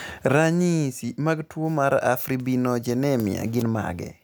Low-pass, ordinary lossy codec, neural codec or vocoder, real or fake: none; none; none; real